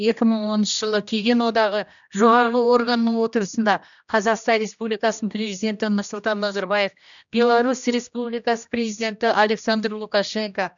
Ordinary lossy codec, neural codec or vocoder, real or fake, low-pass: none; codec, 16 kHz, 1 kbps, X-Codec, HuBERT features, trained on general audio; fake; 7.2 kHz